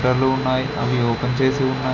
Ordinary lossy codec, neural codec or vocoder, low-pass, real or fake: none; none; 7.2 kHz; real